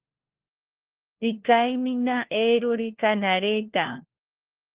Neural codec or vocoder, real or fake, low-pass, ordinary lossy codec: codec, 16 kHz, 1 kbps, FunCodec, trained on LibriTTS, 50 frames a second; fake; 3.6 kHz; Opus, 16 kbps